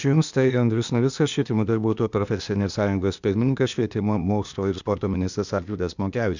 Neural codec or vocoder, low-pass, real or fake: codec, 16 kHz, 0.8 kbps, ZipCodec; 7.2 kHz; fake